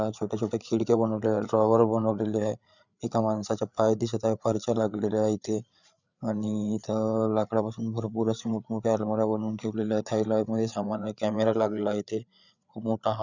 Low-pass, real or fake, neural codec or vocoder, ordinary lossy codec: 7.2 kHz; fake; codec, 16 kHz, 8 kbps, FreqCodec, larger model; none